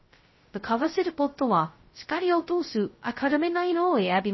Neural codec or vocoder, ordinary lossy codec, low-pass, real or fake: codec, 16 kHz, 0.2 kbps, FocalCodec; MP3, 24 kbps; 7.2 kHz; fake